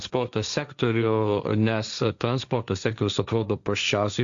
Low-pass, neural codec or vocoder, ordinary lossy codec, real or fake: 7.2 kHz; codec, 16 kHz, 1.1 kbps, Voila-Tokenizer; Opus, 64 kbps; fake